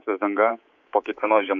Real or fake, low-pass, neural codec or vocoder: fake; 7.2 kHz; codec, 24 kHz, 3.1 kbps, DualCodec